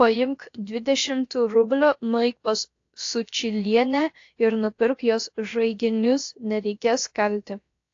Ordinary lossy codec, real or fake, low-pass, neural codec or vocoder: AAC, 48 kbps; fake; 7.2 kHz; codec, 16 kHz, about 1 kbps, DyCAST, with the encoder's durations